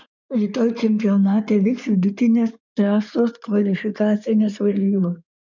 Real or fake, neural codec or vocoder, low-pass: fake; codec, 16 kHz in and 24 kHz out, 2.2 kbps, FireRedTTS-2 codec; 7.2 kHz